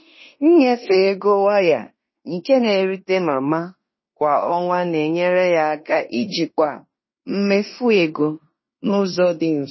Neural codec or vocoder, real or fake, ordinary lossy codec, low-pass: codec, 16 kHz in and 24 kHz out, 0.9 kbps, LongCat-Audio-Codec, fine tuned four codebook decoder; fake; MP3, 24 kbps; 7.2 kHz